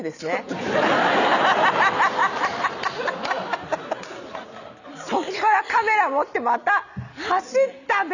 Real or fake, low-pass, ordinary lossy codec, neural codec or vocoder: real; 7.2 kHz; none; none